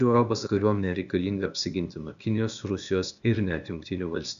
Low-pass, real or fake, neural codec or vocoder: 7.2 kHz; fake; codec, 16 kHz, 0.8 kbps, ZipCodec